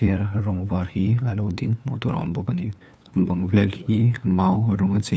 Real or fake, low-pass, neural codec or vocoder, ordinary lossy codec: fake; none; codec, 16 kHz, 2 kbps, FunCodec, trained on LibriTTS, 25 frames a second; none